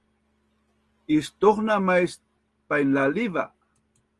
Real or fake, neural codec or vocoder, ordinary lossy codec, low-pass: real; none; Opus, 24 kbps; 10.8 kHz